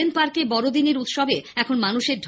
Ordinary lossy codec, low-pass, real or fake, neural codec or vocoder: none; none; real; none